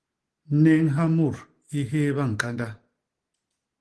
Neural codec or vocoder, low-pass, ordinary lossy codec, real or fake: none; 10.8 kHz; Opus, 16 kbps; real